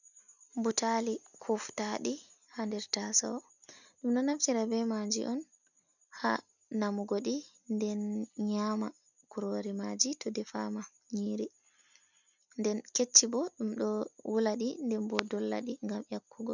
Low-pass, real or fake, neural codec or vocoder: 7.2 kHz; real; none